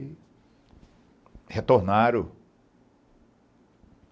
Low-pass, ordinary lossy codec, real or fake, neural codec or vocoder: none; none; real; none